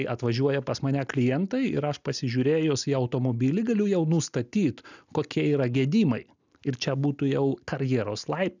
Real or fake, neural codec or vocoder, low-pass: real; none; 7.2 kHz